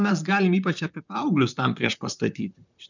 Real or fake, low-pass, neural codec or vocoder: fake; 7.2 kHz; vocoder, 44.1 kHz, 128 mel bands, Pupu-Vocoder